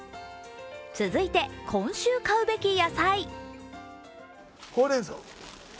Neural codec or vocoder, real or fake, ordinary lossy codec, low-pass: none; real; none; none